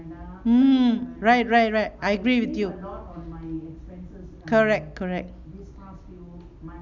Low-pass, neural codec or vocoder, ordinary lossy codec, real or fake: 7.2 kHz; none; none; real